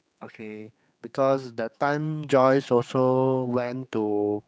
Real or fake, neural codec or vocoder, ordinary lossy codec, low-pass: fake; codec, 16 kHz, 4 kbps, X-Codec, HuBERT features, trained on general audio; none; none